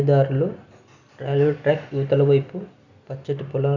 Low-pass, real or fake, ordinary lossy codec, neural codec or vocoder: 7.2 kHz; real; none; none